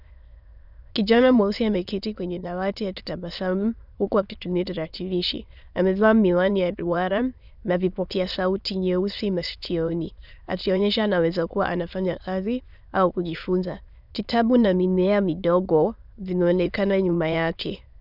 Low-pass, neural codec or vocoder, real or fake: 5.4 kHz; autoencoder, 22.05 kHz, a latent of 192 numbers a frame, VITS, trained on many speakers; fake